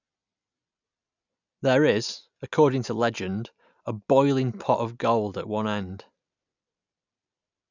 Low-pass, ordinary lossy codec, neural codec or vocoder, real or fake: 7.2 kHz; none; none; real